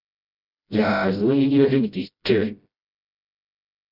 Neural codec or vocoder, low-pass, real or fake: codec, 16 kHz, 0.5 kbps, FreqCodec, smaller model; 5.4 kHz; fake